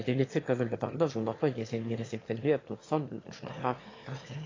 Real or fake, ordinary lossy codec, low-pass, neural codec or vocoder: fake; AAC, 32 kbps; 7.2 kHz; autoencoder, 22.05 kHz, a latent of 192 numbers a frame, VITS, trained on one speaker